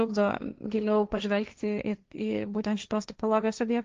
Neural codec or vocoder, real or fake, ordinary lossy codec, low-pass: codec, 16 kHz, 1.1 kbps, Voila-Tokenizer; fake; Opus, 32 kbps; 7.2 kHz